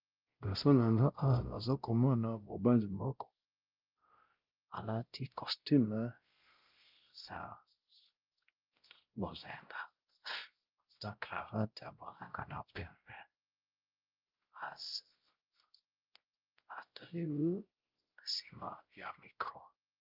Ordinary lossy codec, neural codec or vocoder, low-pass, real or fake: Opus, 32 kbps; codec, 16 kHz, 0.5 kbps, X-Codec, WavLM features, trained on Multilingual LibriSpeech; 5.4 kHz; fake